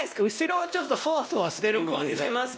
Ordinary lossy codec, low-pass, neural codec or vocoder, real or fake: none; none; codec, 16 kHz, 1 kbps, X-Codec, WavLM features, trained on Multilingual LibriSpeech; fake